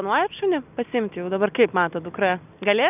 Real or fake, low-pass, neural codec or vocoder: real; 3.6 kHz; none